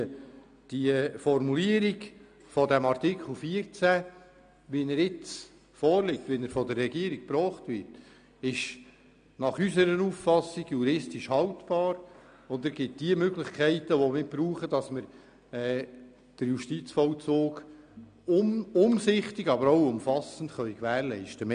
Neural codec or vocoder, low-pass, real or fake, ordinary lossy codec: none; 9.9 kHz; real; MP3, 96 kbps